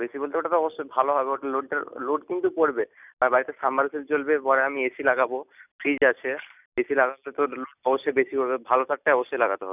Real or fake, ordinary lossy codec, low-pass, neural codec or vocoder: real; none; 3.6 kHz; none